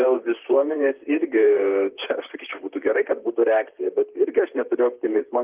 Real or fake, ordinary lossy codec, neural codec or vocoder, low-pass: fake; Opus, 24 kbps; vocoder, 44.1 kHz, 128 mel bands, Pupu-Vocoder; 3.6 kHz